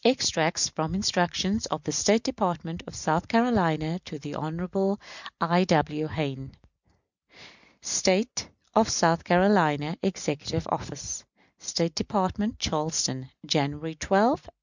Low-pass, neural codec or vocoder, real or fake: 7.2 kHz; none; real